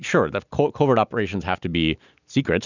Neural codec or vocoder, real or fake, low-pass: none; real; 7.2 kHz